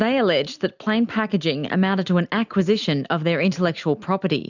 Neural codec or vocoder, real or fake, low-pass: none; real; 7.2 kHz